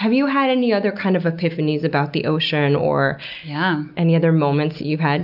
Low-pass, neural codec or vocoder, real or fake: 5.4 kHz; none; real